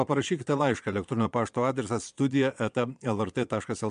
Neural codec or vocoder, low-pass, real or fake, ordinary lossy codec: vocoder, 22.05 kHz, 80 mel bands, Vocos; 9.9 kHz; fake; MP3, 64 kbps